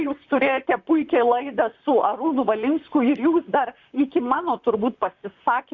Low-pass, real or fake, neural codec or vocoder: 7.2 kHz; fake; vocoder, 44.1 kHz, 128 mel bands, Pupu-Vocoder